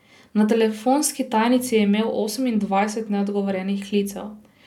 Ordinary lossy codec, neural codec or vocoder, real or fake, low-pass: none; none; real; 19.8 kHz